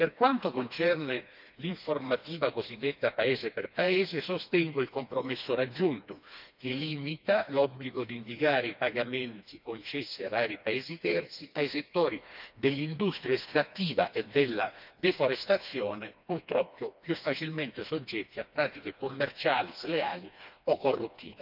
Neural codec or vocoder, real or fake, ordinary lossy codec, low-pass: codec, 16 kHz, 2 kbps, FreqCodec, smaller model; fake; none; 5.4 kHz